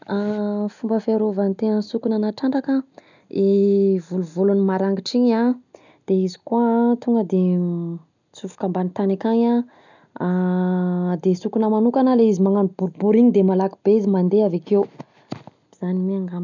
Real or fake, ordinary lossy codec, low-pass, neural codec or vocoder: real; none; 7.2 kHz; none